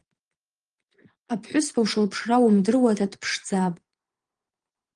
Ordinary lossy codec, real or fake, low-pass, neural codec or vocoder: Opus, 24 kbps; fake; 9.9 kHz; vocoder, 22.05 kHz, 80 mel bands, Vocos